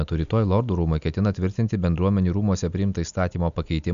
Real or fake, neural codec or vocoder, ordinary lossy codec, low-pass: real; none; MP3, 96 kbps; 7.2 kHz